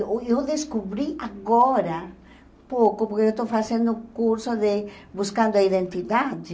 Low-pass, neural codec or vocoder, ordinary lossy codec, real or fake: none; none; none; real